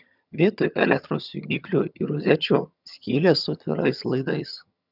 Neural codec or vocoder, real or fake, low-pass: vocoder, 22.05 kHz, 80 mel bands, HiFi-GAN; fake; 5.4 kHz